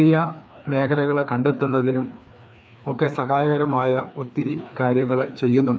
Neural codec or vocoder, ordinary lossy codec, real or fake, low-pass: codec, 16 kHz, 2 kbps, FreqCodec, larger model; none; fake; none